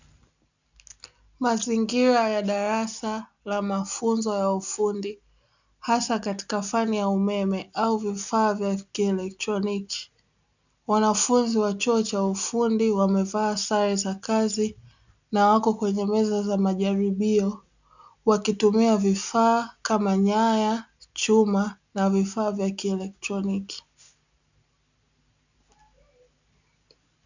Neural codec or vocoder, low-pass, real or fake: none; 7.2 kHz; real